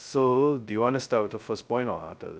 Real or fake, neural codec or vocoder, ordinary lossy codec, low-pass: fake; codec, 16 kHz, 0.2 kbps, FocalCodec; none; none